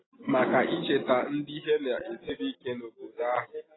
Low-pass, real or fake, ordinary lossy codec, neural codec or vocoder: 7.2 kHz; real; AAC, 16 kbps; none